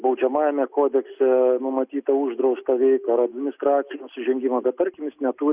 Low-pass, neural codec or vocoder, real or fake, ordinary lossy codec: 3.6 kHz; none; real; Opus, 64 kbps